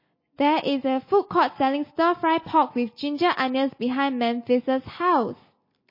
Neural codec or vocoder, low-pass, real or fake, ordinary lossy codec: none; 5.4 kHz; real; MP3, 24 kbps